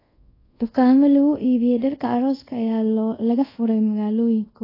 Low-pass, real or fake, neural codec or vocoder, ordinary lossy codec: 5.4 kHz; fake; codec, 24 kHz, 0.5 kbps, DualCodec; AAC, 24 kbps